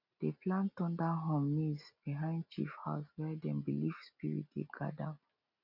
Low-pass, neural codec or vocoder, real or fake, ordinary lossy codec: 5.4 kHz; none; real; none